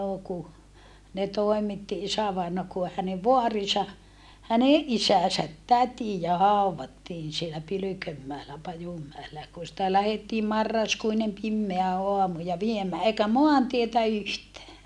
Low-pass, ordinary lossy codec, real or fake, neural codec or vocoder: none; none; real; none